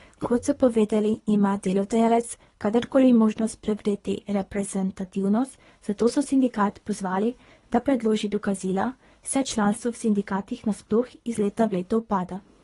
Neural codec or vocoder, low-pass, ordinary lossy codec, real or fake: codec, 24 kHz, 3 kbps, HILCodec; 10.8 kHz; AAC, 32 kbps; fake